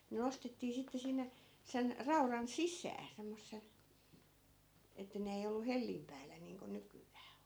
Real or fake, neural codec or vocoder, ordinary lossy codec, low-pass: real; none; none; none